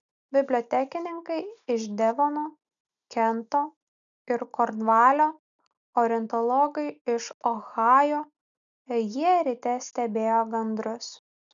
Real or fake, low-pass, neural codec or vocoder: real; 7.2 kHz; none